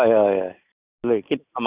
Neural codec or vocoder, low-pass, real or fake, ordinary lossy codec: none; 3.6 kHz; real; Opus, 64 kbps